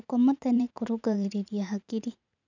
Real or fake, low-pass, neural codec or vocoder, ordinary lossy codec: fake; 7.2 kHz; vocoder, 44.1 kHz, 80 mel bands, Vocos; none